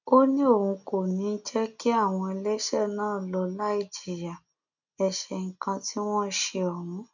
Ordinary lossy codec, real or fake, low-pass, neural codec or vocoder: none; real; 7.2 kHz; none